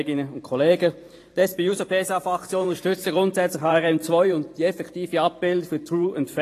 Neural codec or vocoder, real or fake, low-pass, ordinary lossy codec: vocoder, 44.1 kHz, 128 mel bands every 512 samples, BigVGAN v2; fake; 14.4 kHz; AAC, 48 kbps